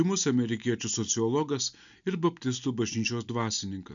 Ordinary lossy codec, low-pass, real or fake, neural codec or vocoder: MP3, 96 kbps; 7.2 kHz; real; none